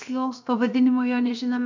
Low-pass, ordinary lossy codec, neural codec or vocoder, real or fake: 7.2 kHz; MP3, 64 kbps; codec, 16 kHz, 0.7 kbps, FocalCodec; fake